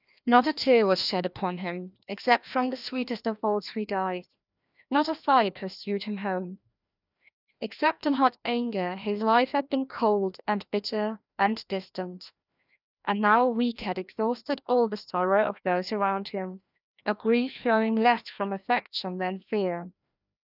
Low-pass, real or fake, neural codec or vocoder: 5.4 kHz; fake; codec, 16 kHz, 1 kbps, FreqCodec, larger model